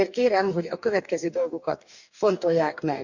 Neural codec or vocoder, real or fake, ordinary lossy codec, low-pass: codec, 44.1 kHz, 2.6 kbps, DAC; fake; none; 7.2 kHz